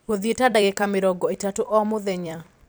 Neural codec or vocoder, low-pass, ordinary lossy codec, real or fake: vocoder, 44.1 kHz, 128 mel bands every 256 samples, BigVGAN v2; none; none; fake